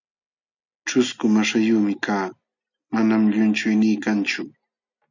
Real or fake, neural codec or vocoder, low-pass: real; none; 7.2 kHz